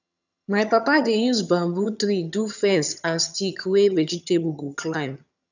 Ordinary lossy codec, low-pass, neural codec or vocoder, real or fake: none; 7.2 kHz; vocoder, 22.05 kHz, 80 mel bands, HiFi-GAN; fake